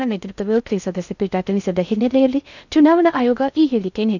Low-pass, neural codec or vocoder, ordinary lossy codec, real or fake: 7.2 kHz; codec, 16 kHz in and 24 kHz out, 0.6 kbps, FocalCodec, streaming, 2048 codes; none; fake